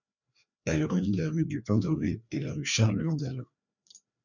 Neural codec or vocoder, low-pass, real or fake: codec, 16 kHz, 2 kbps, FreqCodec, larger model; 7.2 kHz; fake